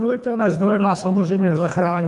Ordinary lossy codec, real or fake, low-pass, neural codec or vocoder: MP3, 96 kbps; fake; 10.8 kHz; codec, 24 kHz, 1.5 kbps, HILCodec